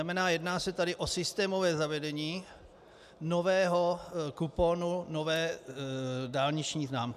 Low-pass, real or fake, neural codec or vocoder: 14.4 kHz; real; none